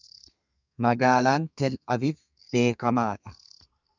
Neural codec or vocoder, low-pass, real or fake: codec, 32 kHz, 1.9 kbps, SNAC; 7.2 kHz; fake